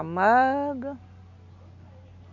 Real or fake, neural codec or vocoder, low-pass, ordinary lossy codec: real; none; 7.2 kHz; none